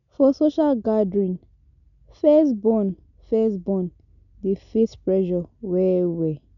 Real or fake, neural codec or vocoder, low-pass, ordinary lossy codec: real; none; 7.2 kHz; none